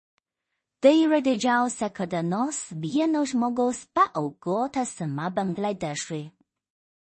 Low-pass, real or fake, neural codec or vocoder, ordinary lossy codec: 10.8 kHz; fake; codec, 16 kHz in and 24 kHz out, 0.4 kbps, LongCat-Audio-Codec, two codebook decoder; MP3, 32 kbps